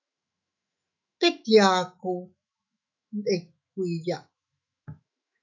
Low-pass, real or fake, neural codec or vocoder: 7.2 kHz; fake; autoencoder, 48 kHz, 128 numbers a frame, DAC-VAE, trained on Japanese speech